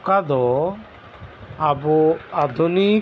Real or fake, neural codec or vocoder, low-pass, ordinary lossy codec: real; none; none; none